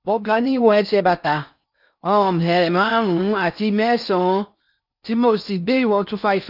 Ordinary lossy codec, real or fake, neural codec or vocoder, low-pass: none; fake; codec, 16 kHz in and 24 kHz out, 0.6 kbps, FocalCodec, streaming, 4096 codes; 5.4 kHz